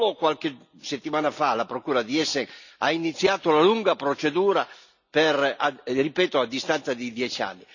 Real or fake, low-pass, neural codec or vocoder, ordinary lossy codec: real; 7.2 kHz; none; none